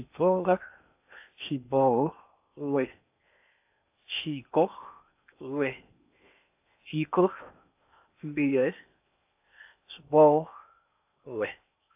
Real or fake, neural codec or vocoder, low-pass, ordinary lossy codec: fake; codec, 16 kHz in and 24 kHz out, 0.8 kbps, FocalCodec, streaming, 65536 codes; 3.6 kHz; AAC, 32 kbps